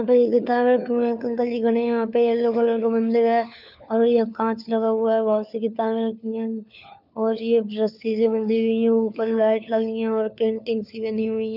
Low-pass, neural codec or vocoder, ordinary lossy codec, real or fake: 5.4 kHz; codec, 16 kHz, 4 kbps, FunCodec, trained on LibriTTS, 50 frames a second; none; fake